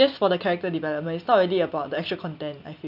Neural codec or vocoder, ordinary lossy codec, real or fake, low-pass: none; none; real; 5.4 kHz